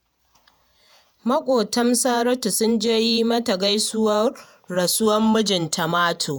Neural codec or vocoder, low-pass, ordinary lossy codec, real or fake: vocoder, 48 kHz, 128 mel bands, Vocos; none; none; fake